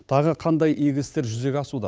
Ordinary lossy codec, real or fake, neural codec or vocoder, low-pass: none; fake; codec, 16 kHz, 8 kbps, FunCodec, trained on Chinese and English, 25 frames a second; none